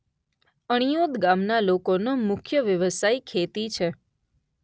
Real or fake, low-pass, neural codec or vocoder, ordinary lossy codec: real; none; none; none